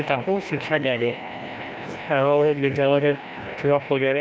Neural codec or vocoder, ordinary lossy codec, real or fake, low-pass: codec, 16 kHz, 1 kbps, FreqCodec, larger model; none; fake; none